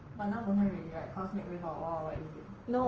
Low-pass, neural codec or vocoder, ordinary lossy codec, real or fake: 7.2 kHz; codec, 44.1 kHz, 7.8 kbps, Pupu-Codec; Opus, 24 kbps; fake